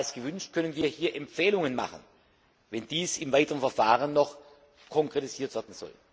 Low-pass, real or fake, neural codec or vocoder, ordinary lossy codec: none; real; none; none